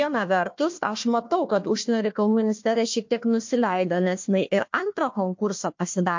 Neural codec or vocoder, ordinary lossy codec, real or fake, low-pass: codec, 16 kHz, 1 kbps, FunCodec, trained on Chinese and English, 50 frames a second; MP3, 48 kbps; fake; 7.2 kHz